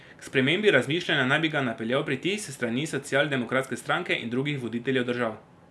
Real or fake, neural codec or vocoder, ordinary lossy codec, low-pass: real; none; none; none